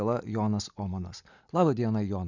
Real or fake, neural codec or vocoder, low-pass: real; none; 7.2 kHz